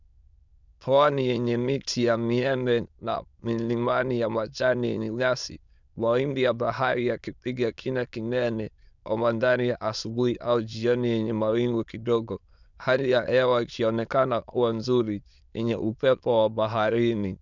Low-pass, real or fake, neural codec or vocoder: 7.2 kHz; fake; autoencoder, 22.05 kHz, a latent of 192 numbers a frame, VITS, trained on many speakers